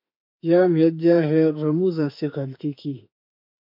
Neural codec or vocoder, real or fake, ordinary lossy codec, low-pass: autoencoder, 48 kHz, 32 numbers a frame, DAC-VAE, trained on Japanese speech; fake; MP3, 48 kbps; 5.4 kHz